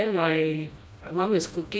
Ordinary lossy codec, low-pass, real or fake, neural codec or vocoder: none; none; fake; codec, 16 kHz, 1 kbps, FreqCodec, smaller model